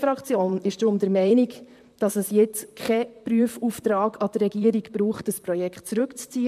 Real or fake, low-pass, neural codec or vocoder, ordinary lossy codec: fake; 14.4 kHz; vocoder, 44.1 kHz, 128 mel bands, Pupu-Vocoder; MP3, 96 kbps